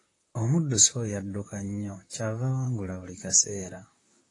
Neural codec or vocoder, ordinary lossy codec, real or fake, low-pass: vocoder, 44.1 kHz, 128 mel bands, Pupu-Vocoder; AAC, 32 kbps; fake; 10.8 kHz